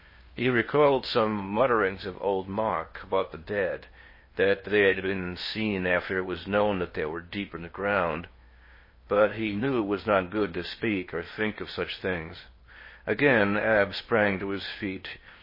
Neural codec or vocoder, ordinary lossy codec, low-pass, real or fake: codec, 16 kHz in and 24 kHz out, 0.8 kbps, FocalCodec, streaming, 65536 codes; MP3, 24 kbps; 5.4 kHz; fake